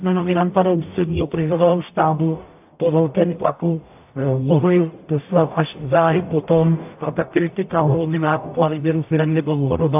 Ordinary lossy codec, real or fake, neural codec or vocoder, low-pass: AAC, 32 kbps; fake; codec, 44.1 kHz, 0.9 kbps, DAC; 3.6 kHz